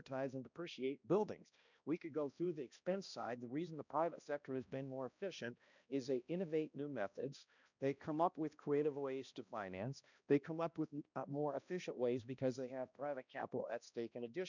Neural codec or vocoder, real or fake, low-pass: codec, 16 kHz, 1 kbps, X-Codec, HuBERT features, trained on balanced general audio; fake; 7.2 kHz